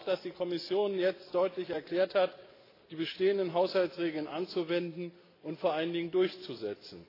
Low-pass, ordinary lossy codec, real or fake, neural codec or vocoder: 5.4 kHz; AAC, 24 kbps; real; none